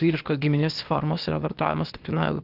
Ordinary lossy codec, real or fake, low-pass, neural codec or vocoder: Opus, 32 kbps; fake; 5.4 kHz; codec, 16 kHz, 0.8 kbps, ZipCodec